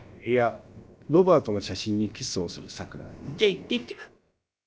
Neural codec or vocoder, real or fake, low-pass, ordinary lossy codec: codec, 16 kHz, about 1 kbps, DyCAST, with the encoder's durations; fake; none; none